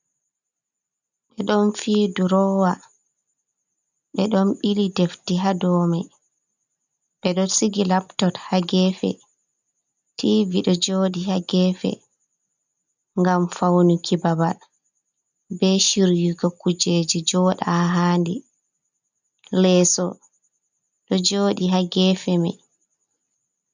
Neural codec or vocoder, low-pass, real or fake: none; 7.2 kHz; real